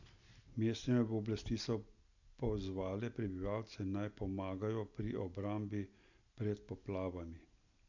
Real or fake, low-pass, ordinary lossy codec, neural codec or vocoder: real; 7.2 kHz; none; none